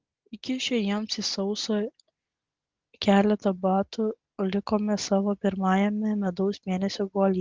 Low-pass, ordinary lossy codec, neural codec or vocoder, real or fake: 7.2 kHz; Opus, 16 kbps; none; real